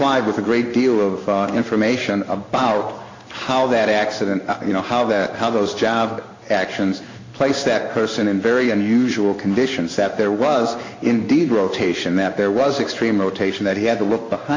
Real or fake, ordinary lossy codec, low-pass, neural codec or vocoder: fake; MP3, 48 kbps; 7.2 kHz; codec, 16 kHz in and 24 kHz out, 1 kbps, XY-Tokenizer